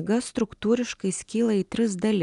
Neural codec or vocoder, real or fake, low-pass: none; real; 10.8 kHz